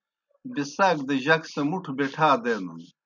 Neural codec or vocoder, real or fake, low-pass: none; real; 7.2 kHz